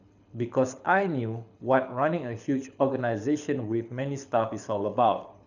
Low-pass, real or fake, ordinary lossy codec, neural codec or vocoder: 7.2 kHz; fake; none; codec, 24 kHz, 6 kbps, HILCodec